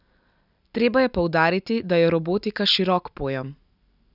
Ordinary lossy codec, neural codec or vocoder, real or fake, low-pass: none; none; real; 5.4 kHz